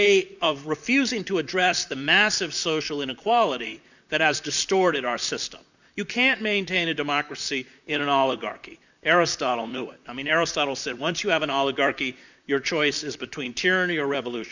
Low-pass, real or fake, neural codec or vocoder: 7.2 kHz; fake; vocoder, 44.1 kHz, 128 mel bands, Pupu-Vocoder